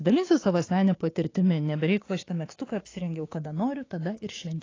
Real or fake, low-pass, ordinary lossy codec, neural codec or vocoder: fake; 7.2 kHz; AAC, 32 kbps; codec, 16 kHz, 6 kbps, DAC